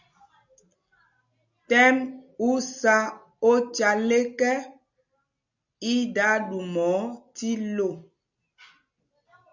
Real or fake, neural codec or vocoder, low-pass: real; none; 7.2 kHz